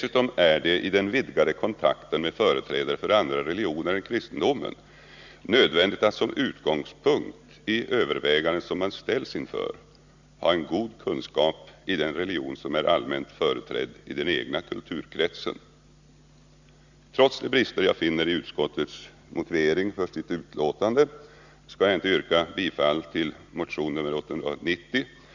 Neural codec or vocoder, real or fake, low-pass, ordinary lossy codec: none; real; 7.2 kHz; Opus, 64 kbps